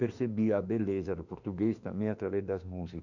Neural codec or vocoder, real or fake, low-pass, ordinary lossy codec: autoencoder, 48 kHz, 32 numbers a frame, DAC-VAE, trained on Japanese speech; fake; 7.2 kHz; none